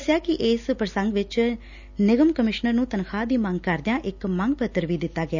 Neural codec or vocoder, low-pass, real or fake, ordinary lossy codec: none; 7.2 kHz; real; none